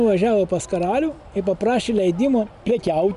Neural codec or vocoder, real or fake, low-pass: none; real; 10.8 kHz